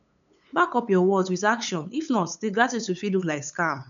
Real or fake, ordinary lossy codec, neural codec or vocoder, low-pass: fake; none; codec, 16 kHz, 8 kbps, FunCodec, trained on LibriTTS, 25 frames a second; 7.2 kHz